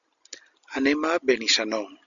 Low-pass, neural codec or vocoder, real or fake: 7.2 kHz; none; real